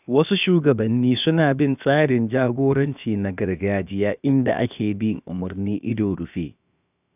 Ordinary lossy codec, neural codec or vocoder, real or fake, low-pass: none; codec, 16 kHz, about 1 kbps, DyCAST, with the encoder's durations; fake; 3.6 kHz